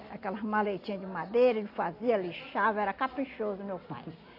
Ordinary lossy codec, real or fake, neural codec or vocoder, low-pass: AAC, 32 kbps; real; none; 5.4 kHz